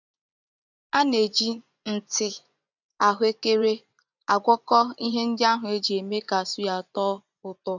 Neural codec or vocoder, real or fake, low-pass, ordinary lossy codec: vocoder, 24 kHz, 100 mel bands, Vocos; fake; 7.2 kHz; none